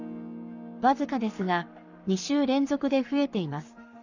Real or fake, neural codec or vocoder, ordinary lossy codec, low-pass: fake; codec, 44.1 kHz, 7.8 kbps, Pupu-Codec; none; 7.2 kHz